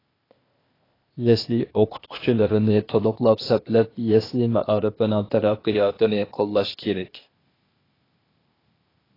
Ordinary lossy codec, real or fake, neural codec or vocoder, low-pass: AAC, 24 kbps; fake; codec, 16 kHz, 0.8 kbps, ZipCodec; 5.4 kHz